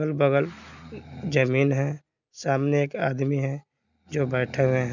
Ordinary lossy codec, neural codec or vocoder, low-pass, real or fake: none; none; 7.2 kHz; real